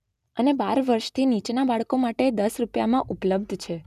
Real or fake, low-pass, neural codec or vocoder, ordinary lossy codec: real; 14.4 kHz; none; none